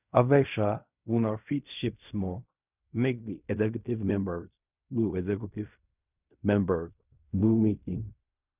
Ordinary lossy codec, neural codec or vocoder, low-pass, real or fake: none; codec, 16 kHz in and 24 kHz out, 0.4 kbps, LongCat-Audio-Codec, fine tuned four codebook decoder; 3.6 kHz; fake